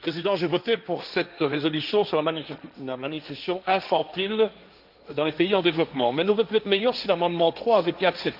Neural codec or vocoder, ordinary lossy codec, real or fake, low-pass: codec, 16 kHz, 1.1 kbps, Voila-Tokenizer; none; fake; 5.4 kHz